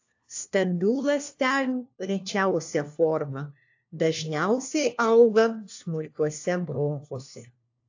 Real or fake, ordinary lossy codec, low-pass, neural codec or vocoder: fake; AAC, 48 kbps; 7.2 kHz; codec, 16 kHz, 1 kbps, FunCodec, trained on LibriTTS, 50 frames a second